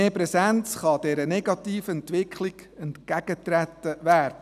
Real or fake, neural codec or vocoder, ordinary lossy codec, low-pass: real; none; none; 14.4 kHz